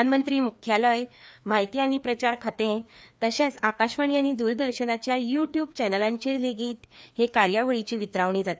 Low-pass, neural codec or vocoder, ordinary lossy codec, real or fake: none; codec, 16 kHz, 2 kbps, FreqCodec, larger model; none; fake